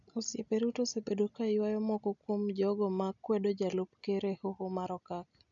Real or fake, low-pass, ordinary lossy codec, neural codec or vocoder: real; 7.2 kHz; none; none